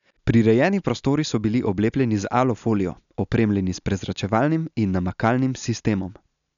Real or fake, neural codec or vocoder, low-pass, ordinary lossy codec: real; none; 7.2 kHz; none